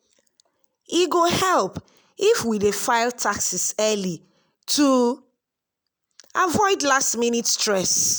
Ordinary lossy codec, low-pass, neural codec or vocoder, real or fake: none; none; none; real